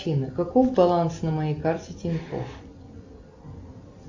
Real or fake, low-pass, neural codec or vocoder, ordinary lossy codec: real; 7.2 kHz; none; AAC, 48 kbps